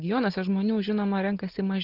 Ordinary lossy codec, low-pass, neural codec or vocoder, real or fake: Opus, 16 kbps; 5.4 kHz; none; real